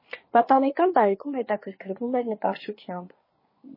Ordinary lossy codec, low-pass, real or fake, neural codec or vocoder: MP3, 24 kbps; 5.4 kHz; fake; codec, 24 kHz, 1 kbps, SNAC